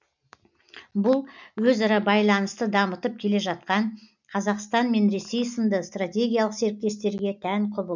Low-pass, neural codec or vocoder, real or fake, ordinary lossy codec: 7.2 kHz; none; real; none